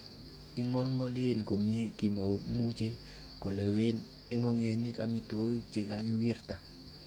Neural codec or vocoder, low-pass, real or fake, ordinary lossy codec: codec, 44.1 kHz, 2.6 kbps, DAC; 19.8 kHz; fake; none